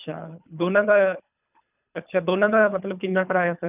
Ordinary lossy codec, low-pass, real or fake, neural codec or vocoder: none; 3.6 kHz; fake; codec, 24 kHz, 3 kbps, HILCodec